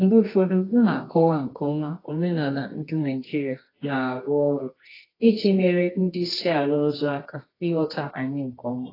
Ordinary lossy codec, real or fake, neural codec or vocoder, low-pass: AAC, 24 kbps; fake; codec, 24 kHz, 0.9 kbps, WavTokenizer, medium music audio release; 5.4 kHz